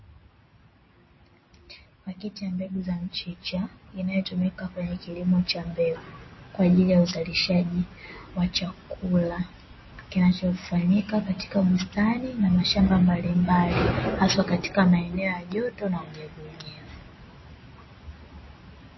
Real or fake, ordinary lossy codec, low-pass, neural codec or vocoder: real; MP3, 24 kbps; 7.2 kHz; none